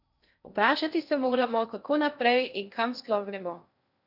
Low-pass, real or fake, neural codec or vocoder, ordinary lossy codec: 5.4 kHz; fake; codec, 16 kHz in and 24 kHz out, 0.6 kbps, FocalCodec, streaming, 2048 codes; none